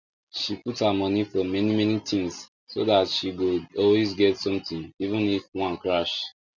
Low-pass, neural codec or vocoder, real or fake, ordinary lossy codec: 7.2 kHz; none; real; none